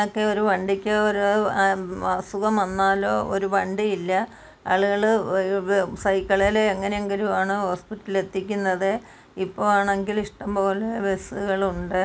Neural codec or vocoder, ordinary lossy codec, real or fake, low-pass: none; none; real; none